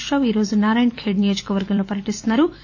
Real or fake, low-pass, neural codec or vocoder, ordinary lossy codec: fake; 7.2 kHz; vocoder, 44.1 kHz, 128 mel bands every 256 samples, BigVGAN v2; AAC, 48 kbps